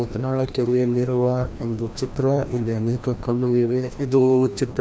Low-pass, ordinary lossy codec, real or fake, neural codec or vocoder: none; none; fake; codec, 16 kHz, 1 kbps, FreqCodec, larger model